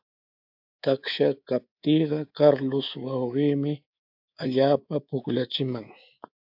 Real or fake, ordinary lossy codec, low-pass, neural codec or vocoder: fake; AAC, 48 kbps; 5.4 kHz; codec, 24 kHz, 3.1 kbps, DualCodec